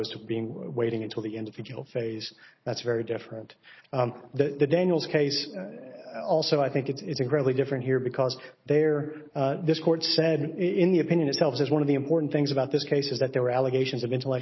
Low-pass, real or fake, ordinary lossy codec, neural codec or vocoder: 7.2 kHz; real; MP3, 24 kbps; none